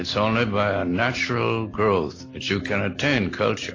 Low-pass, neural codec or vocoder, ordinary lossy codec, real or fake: 7.2 kHz; none; AAC, 32 kbps; real